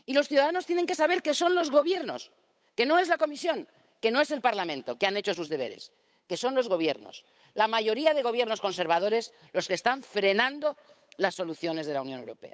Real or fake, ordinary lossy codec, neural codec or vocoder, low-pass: fake; none; codec, 16 kHz, 8 kbps, FunCodec, trained on Chinese and English, 25 frames a second; none